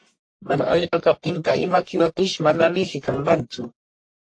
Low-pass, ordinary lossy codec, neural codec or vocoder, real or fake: 9.9 kHz; AAC, 48 kbps; codec, 44.1 kHz, 1.7 kbps, Pupu-Codec; fake